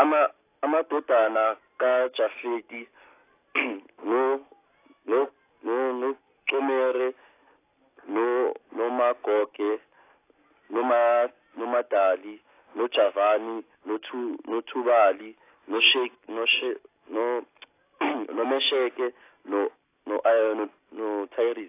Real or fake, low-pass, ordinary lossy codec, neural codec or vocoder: real; 3.6 kHz; AAC, 24 kbps; none